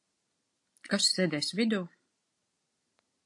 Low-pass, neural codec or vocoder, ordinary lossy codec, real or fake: 10.8 kHz; none; AAC, 64 kbps; real